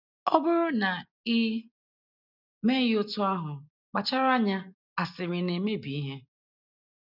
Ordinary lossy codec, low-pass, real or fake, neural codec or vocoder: none; 5.4 kHz; real; none